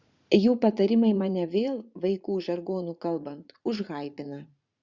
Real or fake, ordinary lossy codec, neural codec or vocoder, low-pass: fake; Opus, 64 kbps; vocoder, 44.1 kHz, 80 mel bands, Vocos; 7.2 kHz